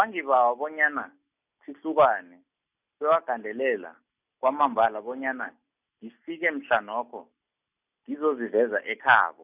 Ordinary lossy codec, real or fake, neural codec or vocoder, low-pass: none; real; none; 3.6 kHz